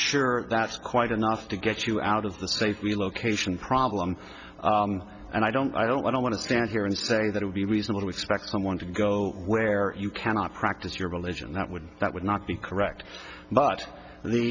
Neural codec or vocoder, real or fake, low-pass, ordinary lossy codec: none; real; 7.2 kHz; Opus, 64 kbps